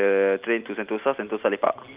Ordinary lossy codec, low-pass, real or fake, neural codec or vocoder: Opus, 32 kbps; 3.6 kHz; real; none